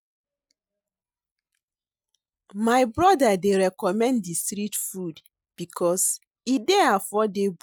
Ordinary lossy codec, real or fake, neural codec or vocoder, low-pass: none; real; none; none